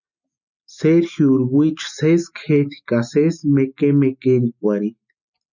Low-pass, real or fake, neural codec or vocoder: 7.2 kHz; real; none